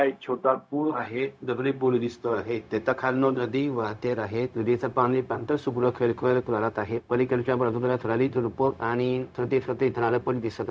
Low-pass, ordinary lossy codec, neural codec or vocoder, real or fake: none; none; codec, 16 kHz, 0.4 kbps, LongCat-Audio-Codec; fake